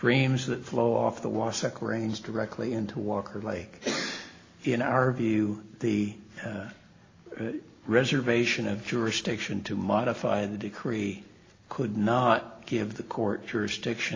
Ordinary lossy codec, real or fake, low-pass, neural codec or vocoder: AAC, 32 kbps; real; 7.2 kHz; none